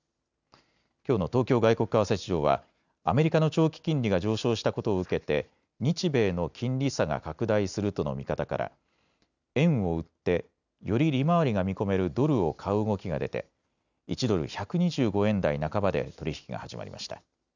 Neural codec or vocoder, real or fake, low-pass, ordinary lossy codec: none; real; 7.2 kHz; none